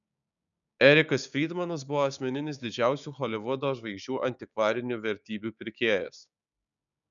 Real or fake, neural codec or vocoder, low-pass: fake; codec, 16 kHz, 6 kbps, DAC; 7.2 kHz